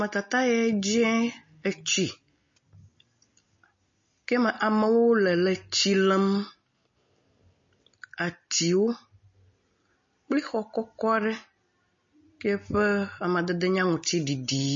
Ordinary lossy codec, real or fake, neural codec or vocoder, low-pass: MP3, 32 kbps; real; none; 7.2 kHz